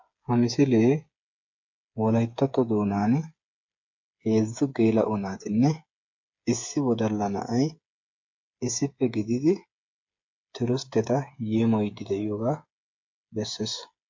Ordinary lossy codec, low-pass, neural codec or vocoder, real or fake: MP3, 48 kbps; 7.2 kHz; codec, 16 kHz, 8 kbps, FreqCodec, smaller model; fake